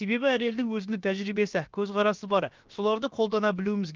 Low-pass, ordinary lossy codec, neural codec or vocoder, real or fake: 7.2 kHz; Opus, 24 kbps; codec, 16 kHz, about 1 kbps, DyCAST, with the encoder's durations; fake